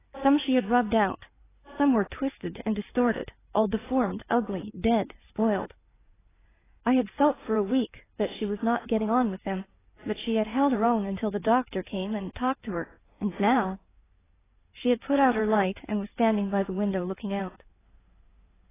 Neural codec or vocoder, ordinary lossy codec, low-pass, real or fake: codec, 16 kHz in and 24 kHz out, 2.2 kbps, FireRedTTS-2 codec; AAC, 16 kbps; 3.6 kHz; fake